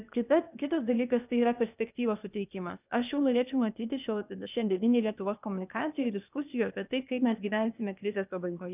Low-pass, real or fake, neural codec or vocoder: 3.6 kHz; fake; codec, 16 kHz, 0.8 kbps, ZipCodec